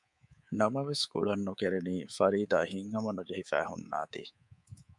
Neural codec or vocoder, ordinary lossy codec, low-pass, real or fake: codec, 24 kHz, 3.1 kbps, DualCodec; MP3, 96 kbps; 10.8 kHz; fake